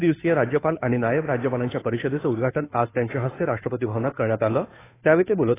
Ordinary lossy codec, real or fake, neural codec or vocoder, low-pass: AAC, 16 kbps; fake; codec, 16 kHz, 2 kbps, FunCodec, trained on Chinese and English, 25 frames a second; 3.6 kHz